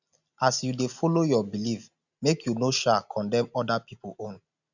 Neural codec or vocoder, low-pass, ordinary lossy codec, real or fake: none; 7.2 kHz; none; real